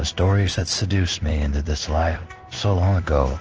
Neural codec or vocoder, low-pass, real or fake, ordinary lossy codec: codec, 16 kHz in and 24 kHz out, 1 kbps, XY-Tokenizer; 7.2 kHz; fake; Opus, 16 kbps